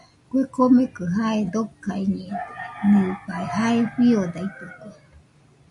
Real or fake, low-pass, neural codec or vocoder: real; 10.8 kHz; none